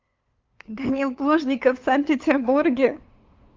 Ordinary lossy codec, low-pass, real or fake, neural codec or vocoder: Opus, 24 kbps; 7.2 kHz; fake; codec, 16 kHz, 2 kbps, FunCodec, trained on LibriTTS, 25 frames a second